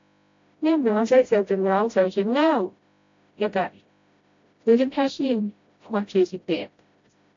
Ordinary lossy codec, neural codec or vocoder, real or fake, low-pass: AAC, 48 kbps; codec, 16 kHz, 0.5 kbps, FreqCodec, smaller model; fake; 7.2 kHz